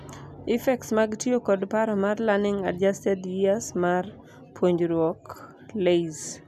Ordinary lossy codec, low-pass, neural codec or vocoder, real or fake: none; 14.4 kHz; none; real